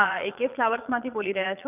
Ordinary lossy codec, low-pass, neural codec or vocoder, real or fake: none; 3.6 kHz; vocoder, 22.05 kHz, 80 mel bands, Vocos; fake